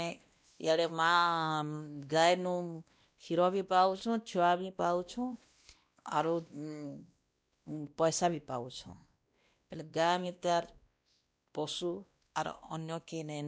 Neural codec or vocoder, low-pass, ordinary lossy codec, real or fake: codec, 16 kHz, 1 kbps, X-Codec, WavLM features, trained on Multilingual LibriSpeech; none; none; fake